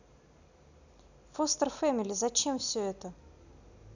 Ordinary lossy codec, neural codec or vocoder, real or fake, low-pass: none; none; real; 7.2 kHz